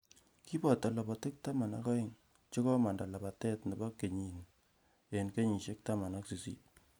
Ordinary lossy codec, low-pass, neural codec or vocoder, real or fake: none; none; none; real